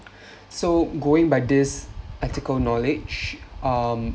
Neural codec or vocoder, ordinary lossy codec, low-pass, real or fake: none; none; none; real